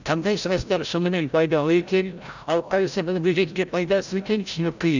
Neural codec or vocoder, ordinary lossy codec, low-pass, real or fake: codec, 16 kHz, 0.5 kbps, FreqCodec, larger model; none; 7.2 kHz; fake